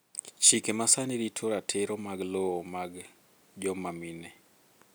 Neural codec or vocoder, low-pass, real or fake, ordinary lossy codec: none; none; real; none